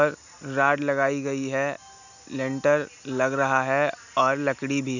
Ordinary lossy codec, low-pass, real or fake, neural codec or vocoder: none; 7.2 kHz; real; none